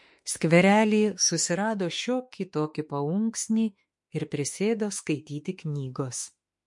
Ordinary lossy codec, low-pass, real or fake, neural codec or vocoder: MP3, 48 kbps; 10.8 kHz; fake; autoencoder, 48 kHz, 32 numbers a frame, DAC-VAE, trained on Japanese speech